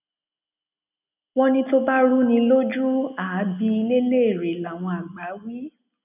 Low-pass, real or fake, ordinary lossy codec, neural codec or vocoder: 3.6 kHz; real; none; none